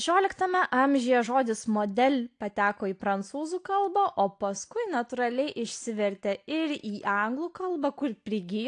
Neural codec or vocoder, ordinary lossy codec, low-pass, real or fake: none; AAC, 48 kbps; 9.9 kHz; real